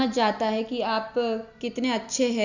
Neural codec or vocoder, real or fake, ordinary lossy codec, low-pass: none; real; none; 7.2 kHz